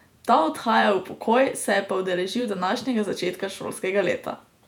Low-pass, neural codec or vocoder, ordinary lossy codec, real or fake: 19.8 kHz; vocoder, 48 kHz, 128 mel bands, Vocos; none; fake